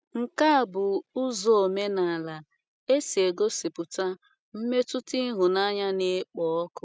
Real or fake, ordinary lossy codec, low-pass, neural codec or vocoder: real; none; none; none